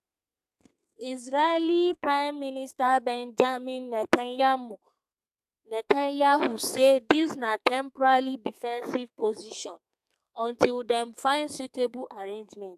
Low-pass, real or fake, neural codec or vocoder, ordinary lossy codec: 14.4 kHz; fake; codec, 32 kHz, 1.9 kbps, SNAC; AAC, 96 kbps